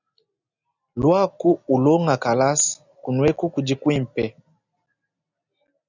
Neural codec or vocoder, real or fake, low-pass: none; real; 7.2 kHz